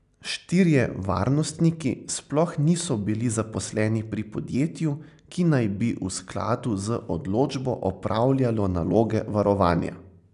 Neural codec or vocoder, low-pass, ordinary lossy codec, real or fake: none; 10.8 kHz; none; real